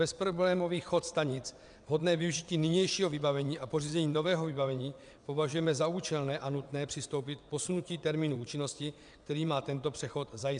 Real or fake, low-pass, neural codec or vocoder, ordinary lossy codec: fake; 9.9 kHz; vocoder, 22.05 kHz, 80 mel bands, WaveNeXt; MP3, 96 kbps